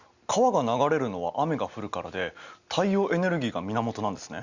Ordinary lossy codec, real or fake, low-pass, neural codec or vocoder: Opus, 64 kbps; real; 7.2 kHz; none